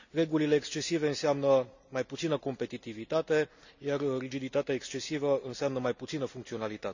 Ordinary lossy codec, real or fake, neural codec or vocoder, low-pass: none; real; none; 7.2 kHz